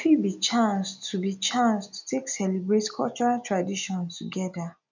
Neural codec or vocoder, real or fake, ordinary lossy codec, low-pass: none; real; none; 7.2 kHz